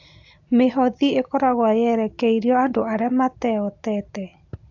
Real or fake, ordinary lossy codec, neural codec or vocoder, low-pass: real; AAC, 48 kbps; none; 7.2 kHz